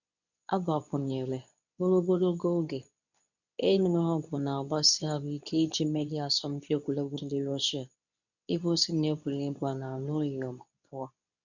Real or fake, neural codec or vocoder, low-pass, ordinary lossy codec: fake; codec, 24 kHz, 0.9 kbps, WavTokenizer, medium speech release version 2; 7.2 kHz; none